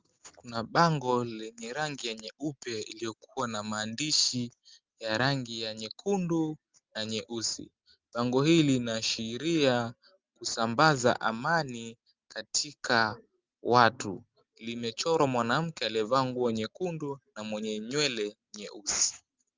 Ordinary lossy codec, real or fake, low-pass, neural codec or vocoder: Opus, 32 kbps; real; 7.2 kHz; none